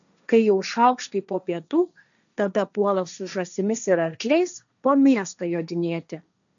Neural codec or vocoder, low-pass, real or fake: codec, 16 kHz, 1.1 kbps, Voila-Tokenizer; 7.2 kHz; fake